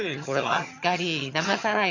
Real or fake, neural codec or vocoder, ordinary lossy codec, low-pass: fake; vocoder, 22.05 kHz, 80 mel bands, HiFi-GAN; none; 7.2 kHz